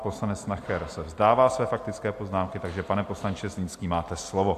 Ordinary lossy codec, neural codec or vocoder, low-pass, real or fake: AAC, 64 kbps; none; 14.4 kHz; real